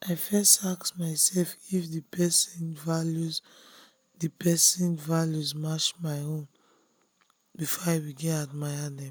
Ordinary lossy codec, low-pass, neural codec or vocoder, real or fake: none; none; none; real